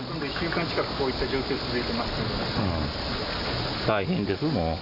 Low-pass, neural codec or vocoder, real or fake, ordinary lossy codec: 5.4 kHz; none; real; none